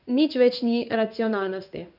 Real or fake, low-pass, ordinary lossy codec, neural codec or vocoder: fake; 5.4 kHz; none; codec, 16 kHz in and 24 kHz out, 1 kbps, XY-Tokenizer